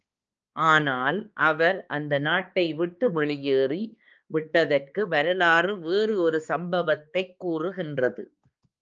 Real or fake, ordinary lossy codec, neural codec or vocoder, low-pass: fake; Opus, 24 kbps; codec, 16 kHz, 2 kbps, X-Codec, HuBERT features, trained on balanced general audio; 7.2 kHz